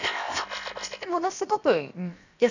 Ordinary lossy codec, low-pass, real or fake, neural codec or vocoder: none; 7.2 kHz; fake; codec, 16 kHz, 0.7 kbps, FocalCodec